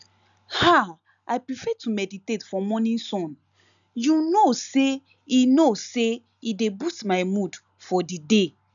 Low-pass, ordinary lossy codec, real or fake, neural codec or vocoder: 7.2 kHz; none; real; none